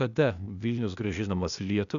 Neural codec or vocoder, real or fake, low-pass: codec, 16 kHz, 0.8 kbps, ZipCodec; fake; 7.2 kHz